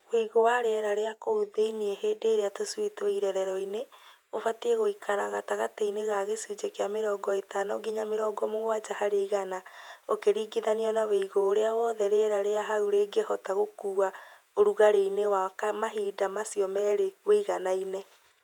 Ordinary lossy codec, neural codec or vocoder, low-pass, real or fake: none; vocoder, 48 kHz, 128 mel bands, Vocos; 19.8 kHz; fake